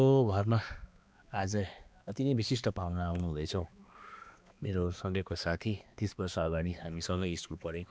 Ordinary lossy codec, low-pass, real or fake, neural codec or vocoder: none; none; fake; codec, 16 kHz, 2 kbps, X-Codec, HuBERT features, trained on balanced general audio